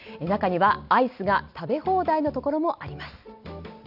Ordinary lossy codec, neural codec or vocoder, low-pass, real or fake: none; none; 5.4 kHz; real